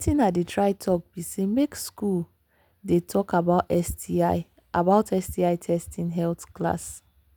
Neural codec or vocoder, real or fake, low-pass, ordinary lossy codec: none; real; none; none